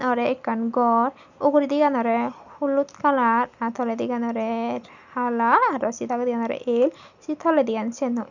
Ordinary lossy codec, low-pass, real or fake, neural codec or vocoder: none; 7.2 kHz; real; none